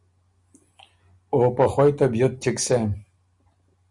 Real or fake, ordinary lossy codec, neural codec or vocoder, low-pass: real; Opus, 64 kbps; none; 10.8 kHz